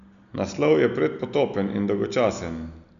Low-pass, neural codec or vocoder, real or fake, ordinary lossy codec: 7.2 kHz; none; real; none